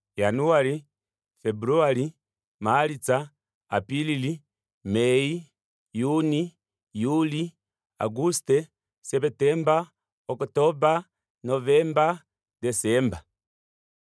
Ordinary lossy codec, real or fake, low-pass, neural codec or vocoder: none; real; none; none